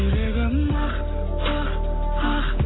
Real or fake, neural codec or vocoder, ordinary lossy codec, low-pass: real; none; AAC, 16 kbps; 7.2 kHz